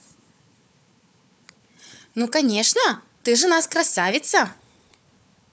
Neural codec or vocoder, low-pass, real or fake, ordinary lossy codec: codec, 16 kHz, 4 kbps, FunCodec, trained on Chinese and English, 50 frames a second; none; fake; none